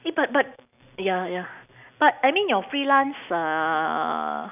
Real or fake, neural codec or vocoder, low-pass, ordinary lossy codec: real; none; 3.6 kHz; none